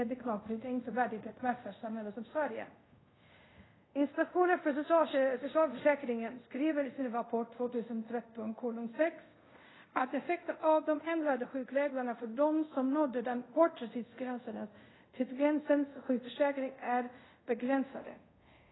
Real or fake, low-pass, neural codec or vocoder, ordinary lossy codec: fake; 7.2 kHz; codec, 24 kHz, 0.5 kbps, DualCodec; AAC, 16 kbps